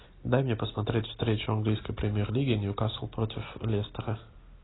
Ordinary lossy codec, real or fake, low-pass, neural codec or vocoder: AAC, 16 kbps; real; 7.2 kHz; none